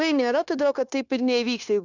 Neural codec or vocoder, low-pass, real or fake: codec, 16 kHz, 0.9 kbps, LongCat-Audio-Codec; 7.2 kHz; fake